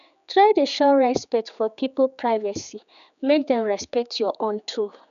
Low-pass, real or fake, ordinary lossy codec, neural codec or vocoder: 7.2 kHz; fake; none; codec, 16 kHz, 4 kbps, X-Codec, HuBERT features, trained on general audio